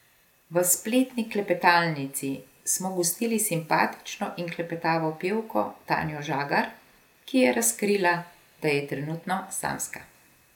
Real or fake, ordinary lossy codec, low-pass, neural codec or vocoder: real; none; 19.8 kHz; none